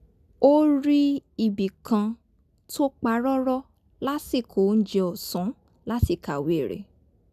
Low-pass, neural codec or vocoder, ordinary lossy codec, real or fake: 14.4 kHz; none; none; real